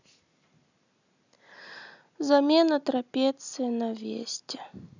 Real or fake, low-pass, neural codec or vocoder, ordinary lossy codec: real; 7.2 kHz; none; none